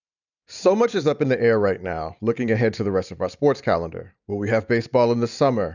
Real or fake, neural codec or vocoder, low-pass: real; none; 7.2 kHz